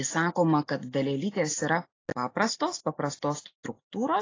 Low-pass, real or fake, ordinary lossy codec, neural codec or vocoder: 7.2 kHz; real; AAC, 32 kbps; none